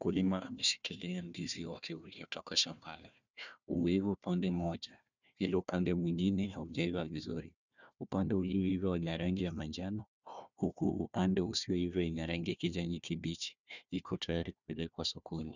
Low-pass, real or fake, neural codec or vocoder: 7.2 kHz; fake; codec, 16 kHz, 1 kbps, FunCodec, trained on Chinese and English, 50 frames a second